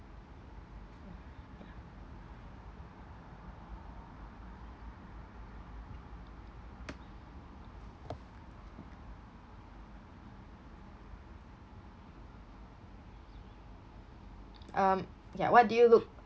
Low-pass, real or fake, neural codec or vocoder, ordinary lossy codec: none; real; none; none